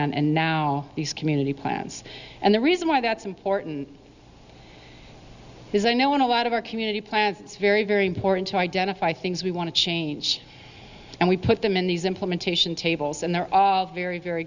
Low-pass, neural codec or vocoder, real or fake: 7.2 kHz; none; real